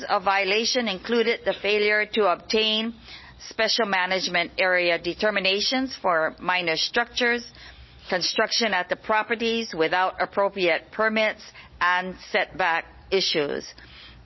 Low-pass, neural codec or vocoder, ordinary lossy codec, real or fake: 7.2 kHz; none; MP3, 24 kbps; real